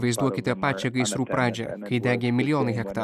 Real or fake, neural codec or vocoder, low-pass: real; none; 14.4 kHz